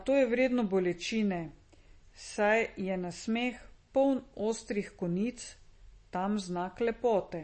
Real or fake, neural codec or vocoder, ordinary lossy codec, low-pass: real; none; MP3, 32 kbps; 10.8 kHz